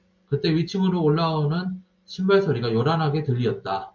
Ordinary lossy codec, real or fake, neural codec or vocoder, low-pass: MP3, 64 kbps; real; none; 7.2 kHz